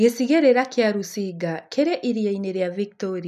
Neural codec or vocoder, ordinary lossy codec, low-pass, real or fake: none; none; 14.4 kHz; real